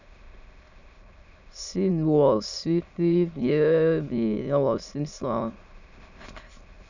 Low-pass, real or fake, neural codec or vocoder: 7.2 kHz; fake; autoencoder, 22.05 kHz, a latent of 192 numbers a frame, VITS, trained on many speakers